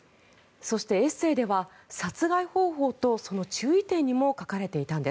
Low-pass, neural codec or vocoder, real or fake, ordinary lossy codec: none; none; real; none